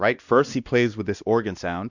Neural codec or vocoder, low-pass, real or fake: codec, 16 kHz, 1 kbps, X-Codec, WavLM features, trained on Multilingual LibriSpeech; 7.2 kHz; fake